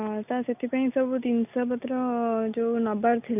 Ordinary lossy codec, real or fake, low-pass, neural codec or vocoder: none; real; 3.6 kHz; none